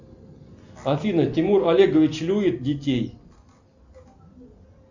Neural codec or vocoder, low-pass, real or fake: none; 7.2 kHz; real